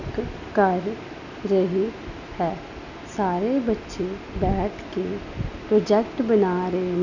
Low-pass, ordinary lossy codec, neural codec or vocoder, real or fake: 7.2 kHz; none; none; real